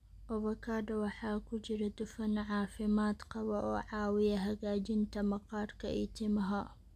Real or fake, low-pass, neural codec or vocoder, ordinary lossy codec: real; 14.4 kHz; none; none